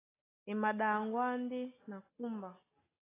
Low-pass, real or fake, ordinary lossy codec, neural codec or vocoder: 3.6 kHz; real; AAC, 16 kbps; none